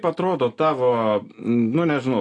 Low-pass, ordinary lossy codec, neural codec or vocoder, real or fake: 10.8 kHz; AAC, 48 kbps; none; real